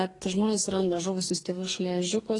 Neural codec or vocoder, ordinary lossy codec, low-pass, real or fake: codec, 44.1 kHz, 2.6 kbps, DAC; AAC, 32 kbps; 10.8 kHz; fake